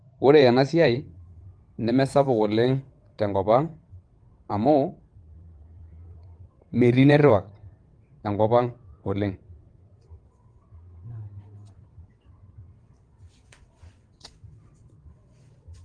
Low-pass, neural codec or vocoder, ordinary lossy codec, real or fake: 9.9 kHz; vocoder, 22.05 kHz, 80 mel bands, WaveNeXt; Opus, 24 kbps; fake